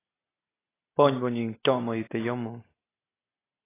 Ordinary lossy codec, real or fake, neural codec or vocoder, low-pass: AAC, 16 kbps; real; none; 3.6 kHz